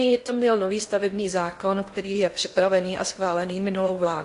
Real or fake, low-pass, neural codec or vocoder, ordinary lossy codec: fake; 10.8 kHz; codec, 16 kHz in and 24 kHz out, 0.8 kbps, FocalCodec, streaming, 65536 codes; AAC, 48 kbps